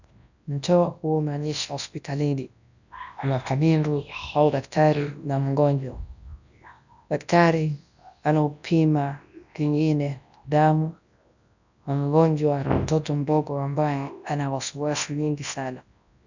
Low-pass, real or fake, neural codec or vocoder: 7.2 kHz; fake; codec, 24 kHz, 0.9 kbps, WavTokenizer, large speech release